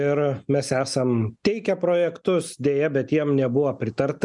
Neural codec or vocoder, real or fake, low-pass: none; real; 10.8 kHz